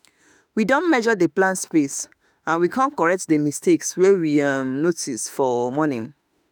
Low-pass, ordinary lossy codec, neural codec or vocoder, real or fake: none; none; autoencoder, 48 kHz, 32 numbers a frame, DAC-VAE, trained on Japanese speech; fake